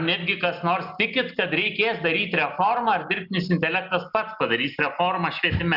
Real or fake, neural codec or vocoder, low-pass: real; none; 5.4 kHz